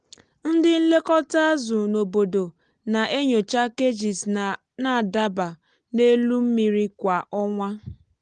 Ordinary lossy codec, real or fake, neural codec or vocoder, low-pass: Opus, 24 kbps; real; none; 9.9 kHz